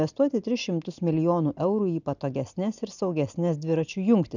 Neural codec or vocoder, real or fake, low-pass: none; real; 7.2 kHz